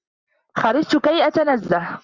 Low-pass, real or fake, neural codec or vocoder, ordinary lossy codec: 7.2 kHz; real; none; Opus, 64 kbps